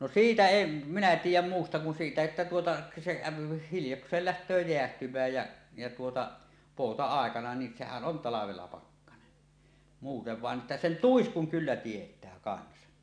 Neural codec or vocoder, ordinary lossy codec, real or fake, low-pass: none; none; real; 9.9 kHz